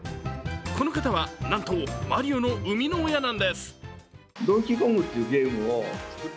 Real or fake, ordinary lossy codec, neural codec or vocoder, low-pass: real; none; none; none